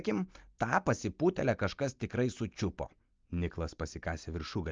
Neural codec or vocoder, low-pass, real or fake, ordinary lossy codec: none; 7.2 kHz; real; Opus, 24 kbps